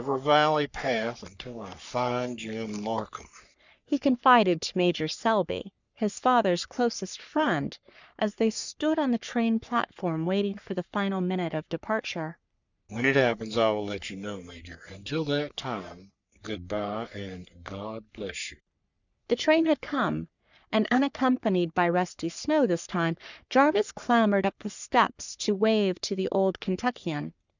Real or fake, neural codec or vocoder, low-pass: fake; codec, 44.1 kHz, 3.4 kbps, Pupu-Codec; 7.2 kHz